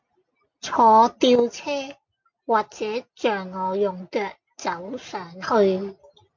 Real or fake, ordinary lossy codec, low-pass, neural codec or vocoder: real; MP3, 48 kbps; 7.2 kHz; none